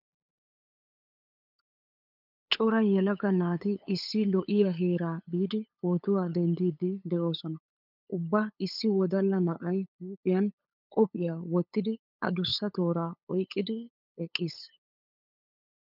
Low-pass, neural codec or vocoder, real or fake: 5.4 kHz; codec, 16 kHz, 8 kbps, FunCodec, trained on LibriTTS, 25 frames a second; fake